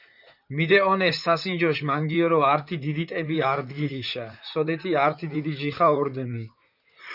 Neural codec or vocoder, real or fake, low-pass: vocoder, 44.1 kHz, 128 mel bands, Pupu-Vocoder; fake; 5.4 kHz